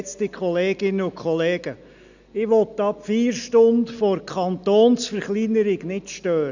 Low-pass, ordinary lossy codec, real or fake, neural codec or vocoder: 7.2 kHz; none; real; none